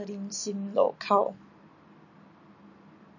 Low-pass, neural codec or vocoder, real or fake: 7.2 kHz; none; real